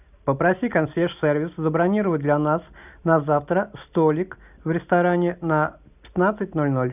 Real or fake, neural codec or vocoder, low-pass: real; none; 3.6 kHz